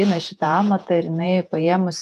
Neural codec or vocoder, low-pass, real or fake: vocoder, 48 kHz, 128 mel bands, Vocos; 14.4 kHz; fake